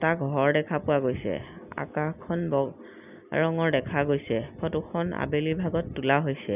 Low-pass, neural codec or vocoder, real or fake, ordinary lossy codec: 3.6 kHz; none; real; none